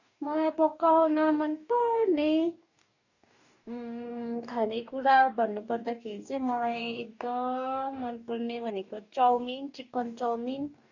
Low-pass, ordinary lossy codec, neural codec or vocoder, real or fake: 7.2 kHz; none; codec, 44.1 kHz, 2.6 kbps, DAC; fake